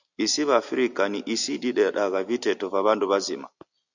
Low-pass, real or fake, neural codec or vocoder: 7.2 kHz; real; none